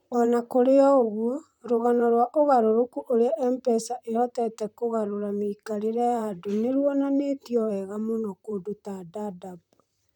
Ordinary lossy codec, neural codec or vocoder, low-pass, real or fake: none; vocoder, 44.1 kHz, 128 mel bands, Pupu-Vocoder; 19.8 kHz; fake